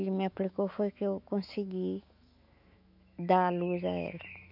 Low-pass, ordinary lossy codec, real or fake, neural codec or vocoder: 5.4 kHz; none; fake; autoencoder, 48 kHz, 128 numbers a frame, DAC-VAE, trained on Japanese speech